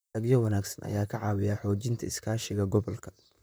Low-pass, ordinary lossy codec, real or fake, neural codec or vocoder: none; none; fake; vocoder, 44.1 kHz, 128 mel bands, Pupu-Vocoder